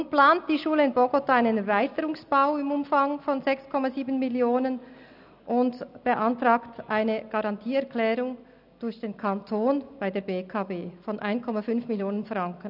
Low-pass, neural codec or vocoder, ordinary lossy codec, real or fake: 5.4 kHz; none; none; real